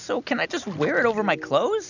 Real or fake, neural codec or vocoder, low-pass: real; none; 7.2 kHz